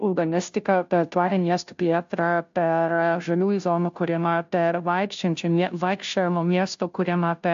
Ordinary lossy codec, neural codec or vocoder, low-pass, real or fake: AAC, 64 kbps; codec, 16 kHz, 0.5 kbps, FunCodec, trained on Chinese and English, 25 frames a second; 7.2 kHz; fake